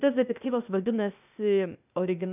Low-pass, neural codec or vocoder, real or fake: 3.6 kHz; codec, 16 kHz, 0.8 kbps, ZipCodec; fake